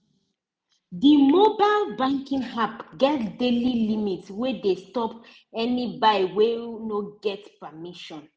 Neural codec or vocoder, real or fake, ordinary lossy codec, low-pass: none; real; none; none